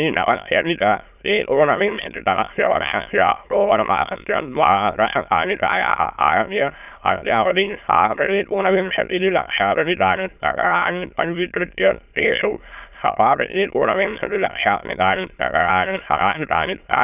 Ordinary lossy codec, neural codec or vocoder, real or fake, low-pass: none; autoencoder, 22.05 kHz, a latent of 192 numbers a frame, VITS, trained on many speakers; fake; 3.6 kHz